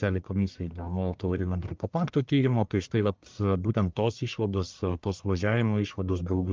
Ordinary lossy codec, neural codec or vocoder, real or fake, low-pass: Opus, 24 kbps; codec, 44.1 kHz, 1.7 kbps, Pupu-Codec; fake; 7.2 kHz